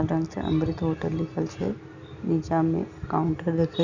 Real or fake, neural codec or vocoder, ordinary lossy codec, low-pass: real; none; Opus, 64 kbps; 7.2 kHz